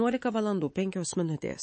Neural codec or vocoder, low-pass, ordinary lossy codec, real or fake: codec, 24 kHz, 3.1 kbps, DualCodec; 9.9 kHz; MP3, 32 kbps; fake